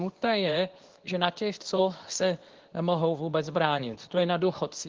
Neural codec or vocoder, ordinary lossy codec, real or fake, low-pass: codec, 24 kHz, 0.9 kbps, WavTokenizer, medium speech release version 2; Opus, 16 kbps; fake; 7.2 kHz